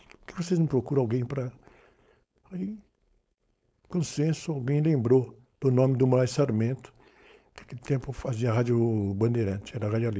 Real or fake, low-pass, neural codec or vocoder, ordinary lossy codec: fake; none; codec, 16 kHz, 4.8 kbps, FACodec; none